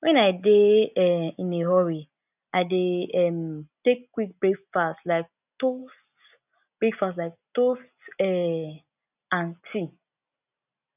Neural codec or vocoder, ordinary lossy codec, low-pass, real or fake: none; none; 3.6 kHz; real